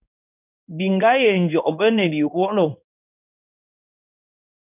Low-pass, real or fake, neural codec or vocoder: 3.6 kHz; fake; codec, 16 kHz in and 24 kHz out, 1 kbps, XY-Tokenizer